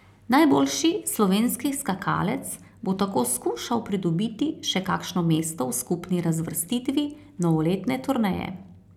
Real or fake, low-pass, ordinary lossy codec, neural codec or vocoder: real; 19.8 kHz; none; none